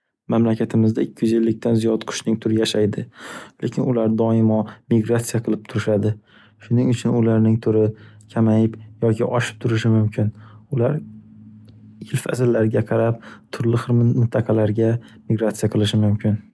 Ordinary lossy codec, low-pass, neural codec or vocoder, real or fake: none; none; none; real